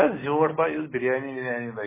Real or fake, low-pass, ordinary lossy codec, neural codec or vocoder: real; 3.6 kHz; MP3, 16 kbps; none